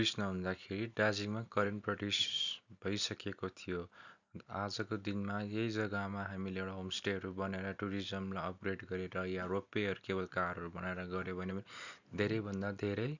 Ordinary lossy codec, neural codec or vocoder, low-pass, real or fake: none; none; 7.2 kHz; real